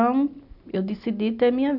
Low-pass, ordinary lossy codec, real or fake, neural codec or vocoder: 5.4 kHz; none; real; none